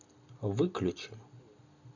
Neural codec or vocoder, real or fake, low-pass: none; real; 7.2 kHz